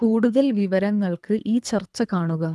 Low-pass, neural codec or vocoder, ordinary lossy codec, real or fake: none; codec, 24 kHz, 3 kbps, HILCodec; none; fake